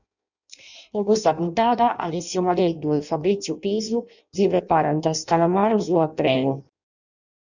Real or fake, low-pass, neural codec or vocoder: fake; 7.2 kHz; codec, 16 kHz in and 24 kHz out, 0.6 kbps, FireRedTTS-2 codec